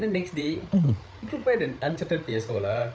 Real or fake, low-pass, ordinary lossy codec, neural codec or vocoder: fake; none; none; codec, 16 kHz, 8 kbps, FreqCodec, larger model